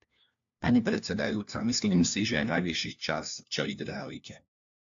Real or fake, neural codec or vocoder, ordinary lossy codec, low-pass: fake; codec, 16 kHz, 1 kbps, FunCodec, trained on LibriTTS, 50 frames a second; AAC, 64 kbps; 7.2 kHz